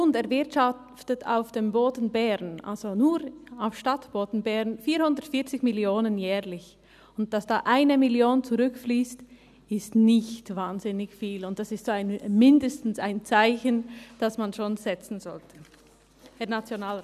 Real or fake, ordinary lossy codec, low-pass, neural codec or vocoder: real; none; 14.4 kHz; none